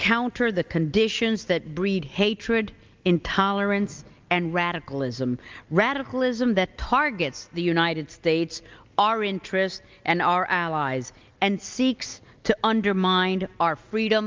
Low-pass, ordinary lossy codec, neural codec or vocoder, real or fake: 7.2 kHz; Opus, 32 kbps; none; real